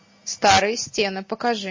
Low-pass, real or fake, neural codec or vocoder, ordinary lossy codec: 7.2 kHz; real; none; MP3, 32 kbps